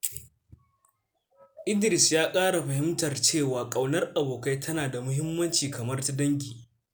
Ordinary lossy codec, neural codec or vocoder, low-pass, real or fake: none; none; none; real